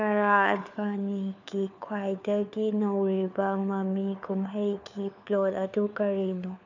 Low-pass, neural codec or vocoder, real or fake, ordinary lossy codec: 7.2 kHz; codec, 16 kHz, 4 kbps, FunCodec, trained on LibriTTS, 50 frames a second; fake; none